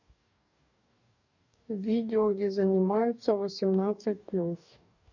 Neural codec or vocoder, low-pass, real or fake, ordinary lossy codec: codec, 44.1 kHz, 2.6 kbps, DAC; 7.2 kHz; fake; none